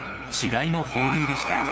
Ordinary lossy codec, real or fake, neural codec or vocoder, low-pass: none; fake; codec, 16 kHz, 2 kbps, FunCodec, trained on LibriTTS, 25 frames a second; none